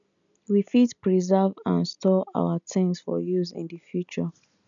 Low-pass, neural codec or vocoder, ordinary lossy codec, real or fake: 7.2 kHz; none; none; real